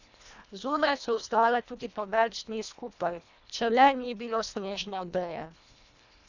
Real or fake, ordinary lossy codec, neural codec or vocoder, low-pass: fake; none; codec, 24 kHz, 1.5 kbps, HILCodec; 7.2 kHz